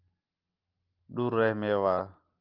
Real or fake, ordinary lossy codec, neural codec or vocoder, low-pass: real; Opus, 24 kbps; none; 5.4 kHz